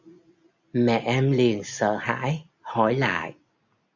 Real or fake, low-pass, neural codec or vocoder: real; 7.2 kHz; none